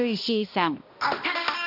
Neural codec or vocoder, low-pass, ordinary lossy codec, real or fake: codec, 16 kHz, 1 kbps, X-Codec, HuBERT features, trained on balanced general audio; 5.4 kHz; none; fake